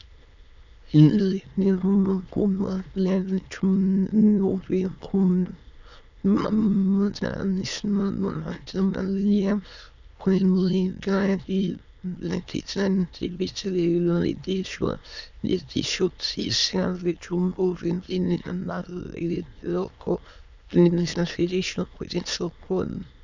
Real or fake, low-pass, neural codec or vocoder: fake; 7.2 kHz; autoencoder, 22.05 kHz, a latent of 192 numbers a frame, VITS, trained on many speakers